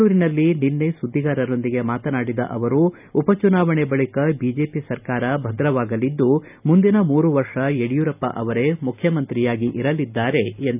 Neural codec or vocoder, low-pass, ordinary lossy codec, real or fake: none; 3.6 kHz; none; real